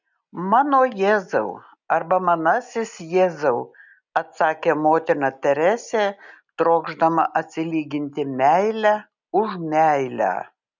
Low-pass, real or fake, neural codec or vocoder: 7.2 kHz; real; none